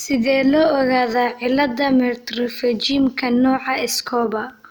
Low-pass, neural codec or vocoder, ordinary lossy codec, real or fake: none; none; none; real